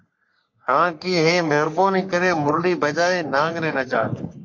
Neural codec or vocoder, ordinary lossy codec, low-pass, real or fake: codec, 44.1 kHz, 3.4 kbps, Pupu-Codec; MP3, 48 kbps; 7.2 kHz; fake